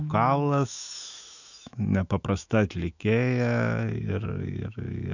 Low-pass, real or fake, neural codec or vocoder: 7.2 kHz; fake; autoencoder, 48 kHz, 128 numbers a frame, DAC-VAE, trained on Japanese speech